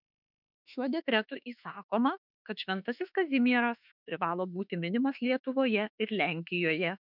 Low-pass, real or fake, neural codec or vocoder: 5.4 kHz; fake; autoencoder, 48 kHz, 32 numbers a frame, DAC-VAE, trained on Japanese speech